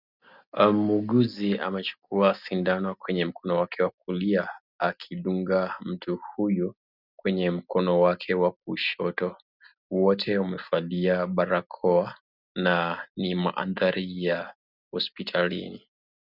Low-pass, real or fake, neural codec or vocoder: 5.4 kHz; real; none